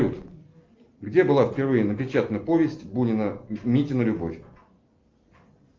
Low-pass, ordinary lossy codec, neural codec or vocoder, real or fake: 7.2 kHz; Opus, 32 kbps; none; real